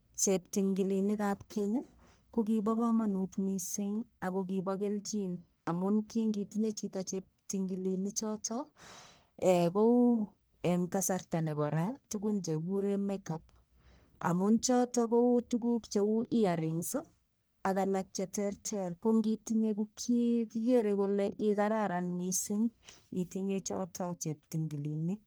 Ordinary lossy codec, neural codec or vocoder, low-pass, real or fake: none; codec, 44.1 kHz, 1.7 kbps, Pupu-Codec; none; fake